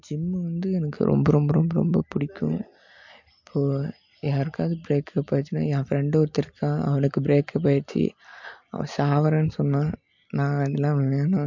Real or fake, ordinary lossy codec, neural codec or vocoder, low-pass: real; MP3, 48 kbps; none; 7.2 kHz